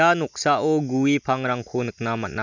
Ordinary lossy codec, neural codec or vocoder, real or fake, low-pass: none; none; real; 7.2 kHz